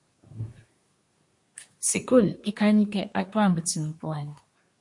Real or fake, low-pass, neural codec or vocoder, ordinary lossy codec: fake; 10.8 kHz; codec, 24 kHz, 1 kbps, SNAC; MP3, 48 kbps